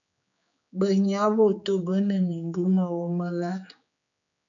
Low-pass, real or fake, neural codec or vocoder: 7.2 kHz; fake; codec, 16 kHz, 4 kbps, X-Codec, HuBERT features, trained on general audio